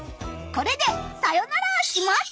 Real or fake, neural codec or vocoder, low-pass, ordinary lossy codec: real; none; none; none